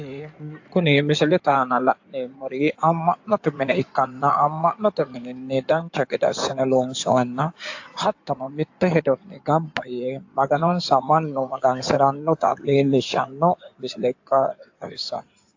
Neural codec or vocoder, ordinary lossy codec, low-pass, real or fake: codec, 16 kHz in and 24 kHz out, 2.2 kbps, FireRedTTS-2 codec; AAC, 48 kbps; 7.2 kHz; fake